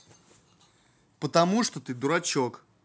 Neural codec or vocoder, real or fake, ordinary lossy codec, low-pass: none; real; none; none